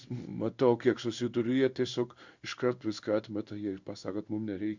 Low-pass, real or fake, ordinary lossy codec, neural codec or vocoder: 7.2 kHz; fake; Opus, 64 kbps; codec, 16 kHz in and 24 kHz out, 1 kbps, XY-Tokenizer